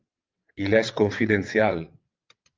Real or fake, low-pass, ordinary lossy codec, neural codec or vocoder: fake; 7.2 kHz; Opus, 24 kbps; vocoder, 22.05 kHz, 80 mel bands, WaveNeXt